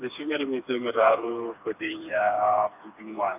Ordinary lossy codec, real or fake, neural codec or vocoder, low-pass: MP3, 32 kbps; fake; codec, 16 kHz, 2 kbps, FreqCodec, smaller model; 3.6 kHz